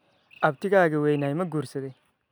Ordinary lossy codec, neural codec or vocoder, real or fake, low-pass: none; none; real; none